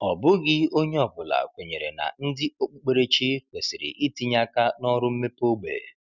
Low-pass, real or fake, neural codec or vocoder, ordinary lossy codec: 7.2 kHz; real; none; none